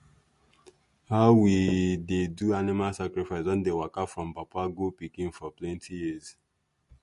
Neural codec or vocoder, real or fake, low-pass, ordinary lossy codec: none; real; 14.4 kHz; MP3, 48 kbps